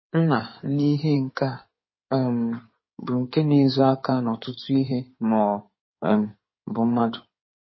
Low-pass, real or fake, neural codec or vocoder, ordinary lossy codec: 7.2 kHz; fake; codec, 16 kHz in and 24 kHz out, 2.2 kbps, FireRedTTS-2 codec; MP3, 24 kbps